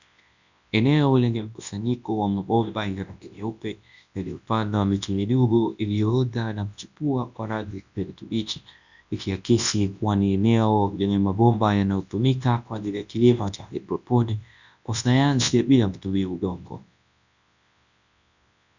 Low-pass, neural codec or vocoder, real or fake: 7.2 kHz; codec, 24 kHz, 0.9 kbps, WavTokenizer, large speech release; fake